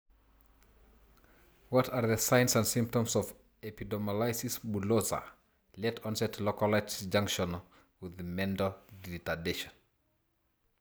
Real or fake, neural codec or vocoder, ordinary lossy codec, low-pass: real; none; none; none